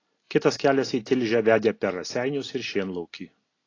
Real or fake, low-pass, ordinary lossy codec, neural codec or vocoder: real; 7.2 kHz; AAC, 32 kbps; none